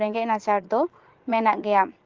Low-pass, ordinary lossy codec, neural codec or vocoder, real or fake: 7.2 kHz; Opus, 16 kbps; vocoder, 44.1 kHz, 80 mel bands, Vocos; fake